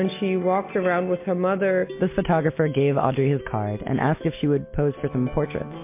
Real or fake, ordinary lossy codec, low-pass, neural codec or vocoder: real; MP3, 24 kbps; 3.6 kHz; none